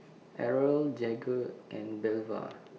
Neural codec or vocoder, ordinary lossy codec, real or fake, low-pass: none; none; real; none